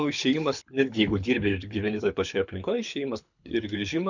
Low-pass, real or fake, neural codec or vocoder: 7.2 kHz; fake; codec, 24 kHz, 6 kbps, HILCodec